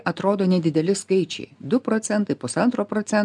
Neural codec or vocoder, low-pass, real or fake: none; 10.8 kHz; real